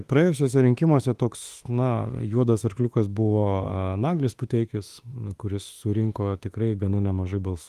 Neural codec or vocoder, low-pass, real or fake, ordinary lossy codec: autoencoder, 48 kHz, 32 numbers a frame, DAC-VAE, trained on Japanese speech; 14.4 kHz; fake; Opus, 24 kbps